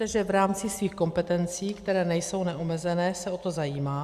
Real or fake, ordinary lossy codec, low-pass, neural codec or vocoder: real; Opus, 64 kbps; 14.4 kHz; none